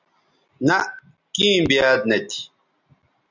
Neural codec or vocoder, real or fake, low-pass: none; real; 7.2 kHz